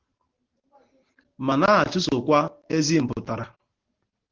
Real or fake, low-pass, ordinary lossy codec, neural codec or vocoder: real; 7.2 kHz; Opus, 16 kbps; none